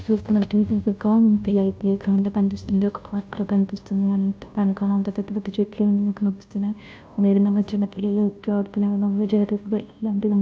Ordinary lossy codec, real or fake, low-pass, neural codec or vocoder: none; fake; none; codec, 16 kHz, 0.5 kbps, FunCodec, trained on Chinese and English, 25 frames a second